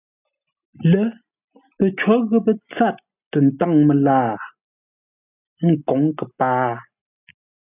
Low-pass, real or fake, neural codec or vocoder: 3.6 kHz; real; none